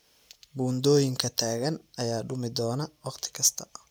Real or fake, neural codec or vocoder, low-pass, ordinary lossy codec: real; none; none; none